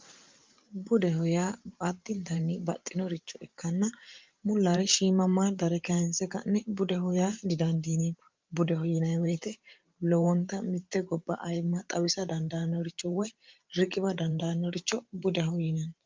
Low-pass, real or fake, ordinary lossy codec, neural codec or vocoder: 7.2 kHz; fake; Opus, 32 kbps; vocoder, 44.1 kHz, 128 mel bands every 512 samples, BigVGAN v2